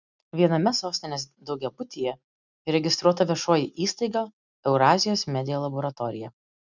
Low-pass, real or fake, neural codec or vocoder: 7.2 kHz; real; none